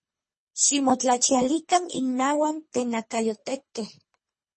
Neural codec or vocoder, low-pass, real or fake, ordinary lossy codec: codec, 24 kHz, 3 kbps, HILCodec; 10.8 kHz; fake; MP3, 32 kbps